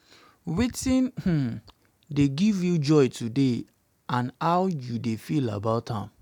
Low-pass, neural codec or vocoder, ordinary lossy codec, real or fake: 19.8 kHz; none; none; real